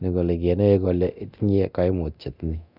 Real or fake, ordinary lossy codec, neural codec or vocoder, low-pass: fake; none; codec, 24 kHz, 0.9 kbps, DualCodec; 5.4 kHz